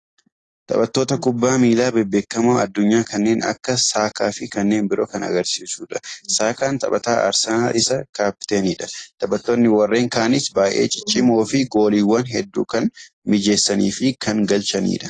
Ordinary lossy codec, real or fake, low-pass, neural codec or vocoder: AAC, 32 kbps; real; 10.8 kHz; none